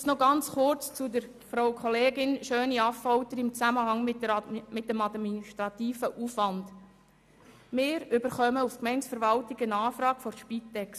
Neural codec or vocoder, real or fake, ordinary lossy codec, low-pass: none; real; none; 14.4 kHz